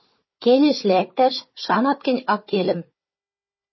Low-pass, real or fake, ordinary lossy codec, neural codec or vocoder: 7.2 kHz; fake; MP3, 24 kbps; codec, 16 kHz, 4 kbps, FunCodec, trained on Chinese and English, 50 frames a second